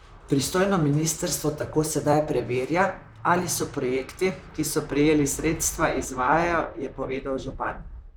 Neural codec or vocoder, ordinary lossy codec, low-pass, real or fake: vocoder, 44.1 kHz, 128 mel bands, Pupu-Vocoder; none; none; fake